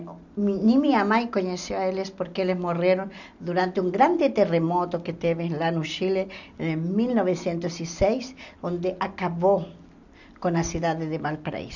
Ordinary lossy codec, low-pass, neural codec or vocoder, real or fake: none; 7.2 kHz; none; real